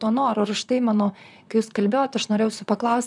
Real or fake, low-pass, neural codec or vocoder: fake; 10.8 kHz; vocoder, 44.1 kHz, 128 mel bands, Pupu-Vocoder